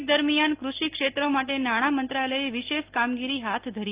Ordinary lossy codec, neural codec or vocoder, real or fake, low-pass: Opus, 32 kbps; none; real; 3.6 kHz